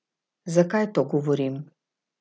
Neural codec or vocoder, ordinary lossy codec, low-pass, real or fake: none; none; none; real